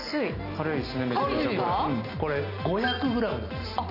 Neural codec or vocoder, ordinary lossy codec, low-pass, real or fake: none; none; 5.4 kHz; real